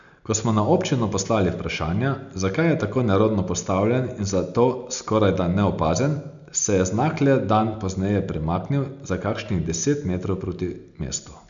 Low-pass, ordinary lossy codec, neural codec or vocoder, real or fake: 7.2 kHz; none; none; real